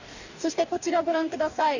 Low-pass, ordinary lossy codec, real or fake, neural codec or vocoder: 7.2 kHz; none; fake; codec, 44.1 kHz, 2.6 kbps, DAC